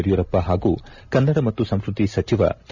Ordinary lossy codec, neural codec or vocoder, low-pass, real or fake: none; none; 7.2 kHz; real